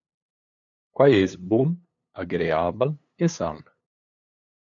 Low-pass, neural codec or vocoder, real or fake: 7.2 kHz; codec, 16 kHz, 8 kbps, FunCodec, trained on LibriTTS, 25 frames a second; fake